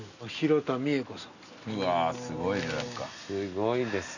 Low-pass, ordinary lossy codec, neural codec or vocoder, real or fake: 7.2 kHz; none; none; real